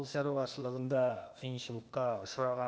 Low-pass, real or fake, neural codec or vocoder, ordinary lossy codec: none; fake; codec, 16 kHz, 0.8 kbps, ZipCodec; none